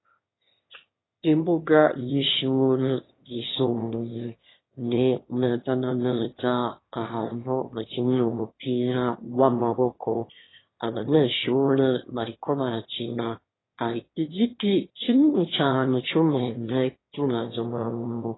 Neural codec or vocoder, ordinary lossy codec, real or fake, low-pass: autoencoder, 22.05 kHz, a latent of 192 numbers a frame, VITS, trained on one speaker; AAC, 16 kbps; fake; 7.2 kHz